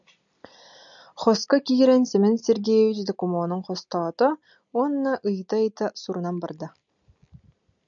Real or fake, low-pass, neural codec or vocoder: real; 7.2 kHz; none